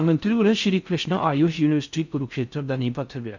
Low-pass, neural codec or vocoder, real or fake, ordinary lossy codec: 7.2 kHz; codec, 16 kHz in and 24 kHz out, 0.6 kbps, FocalCodec, streaming, 4096 codes; fake; none